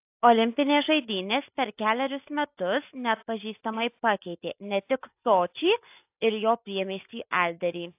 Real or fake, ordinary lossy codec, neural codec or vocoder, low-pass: real; AAC, 32 kbps; none; 3.6 kHz